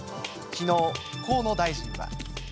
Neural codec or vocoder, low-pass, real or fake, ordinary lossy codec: none; none; real; none